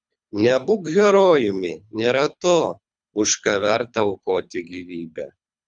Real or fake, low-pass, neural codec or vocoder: fake; 9.9 kHz; codec, 24 kHz, 3 kbps, HILCodec